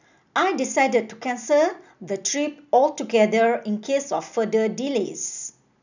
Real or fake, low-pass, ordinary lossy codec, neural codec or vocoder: real; 7.2 kHz; none; none